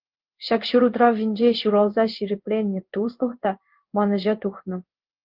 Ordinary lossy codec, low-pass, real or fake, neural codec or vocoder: Opus, 24 kbps; 5.4 kHz; fake; codec, 16 kHz in and 24 kHz out, 1 kbps, XY-Tokenizer